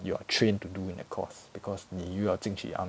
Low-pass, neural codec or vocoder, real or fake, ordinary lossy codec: none; none; real; none